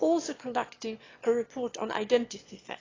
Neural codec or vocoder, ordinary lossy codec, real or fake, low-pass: autoencoder, 22.05 kHz, a latent of 192 numbers a frame, VITS, trained on one speaker; AAC, 32 kbps; fake; 7.2 kHz